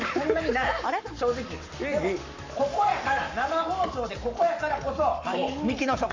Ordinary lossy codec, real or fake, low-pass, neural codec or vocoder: AAC, 48 kbps; fake; 7.2 kHz; codec, 44.1 kHz, 7.8 kbps, Pupu-Codec